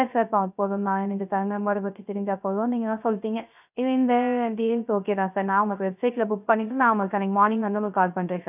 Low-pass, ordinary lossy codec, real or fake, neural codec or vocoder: 3.6 kHz; none; fake; codec, 16 kHz, 0.3 kbps, FocalCodec